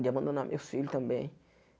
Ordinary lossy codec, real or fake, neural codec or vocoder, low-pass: none; real; none; none